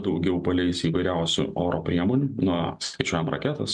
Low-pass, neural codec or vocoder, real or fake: 10.8 kHz; none; real